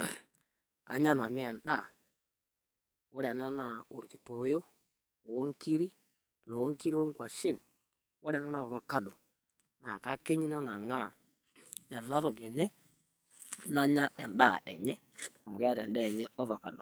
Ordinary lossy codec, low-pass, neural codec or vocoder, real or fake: none; none; codec, 44.1 kHz, 2.6 kbps, SNAC; fake